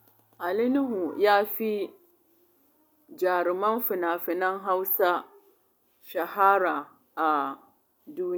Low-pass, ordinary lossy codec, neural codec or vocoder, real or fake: none; none; none; real